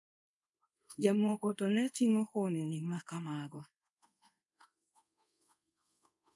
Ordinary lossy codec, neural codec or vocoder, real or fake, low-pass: none; codec, 24 kHz, 0.5 kbps, DualCodec; fake; none